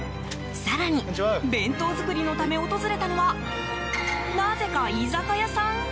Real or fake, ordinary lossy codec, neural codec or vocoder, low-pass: real; none; none; none